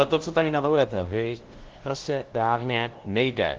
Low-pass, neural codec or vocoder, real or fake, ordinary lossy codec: 7.2 kHz; codec, 16 kHz, 0.5 kbps, FunCodec, trained on LibriTTS, 25 frames a second; fake; Opus, 16 kbps